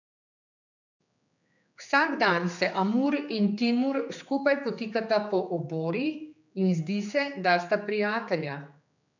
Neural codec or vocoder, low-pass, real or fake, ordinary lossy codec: codec, 16 kHz, 4 kbps, X-Codec, HuBERT features, trained on general audio; 7.2 kHz; fake; none